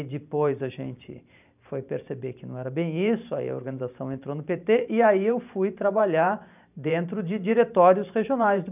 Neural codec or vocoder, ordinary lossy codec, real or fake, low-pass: vocoder, 44.1 kHz, 128 mel bands every 512 samples, BigVGAN v2; none; fake; 3.6 kHz